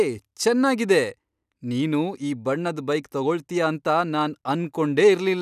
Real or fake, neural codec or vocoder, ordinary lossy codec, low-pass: real; none; none; 14.4 kHz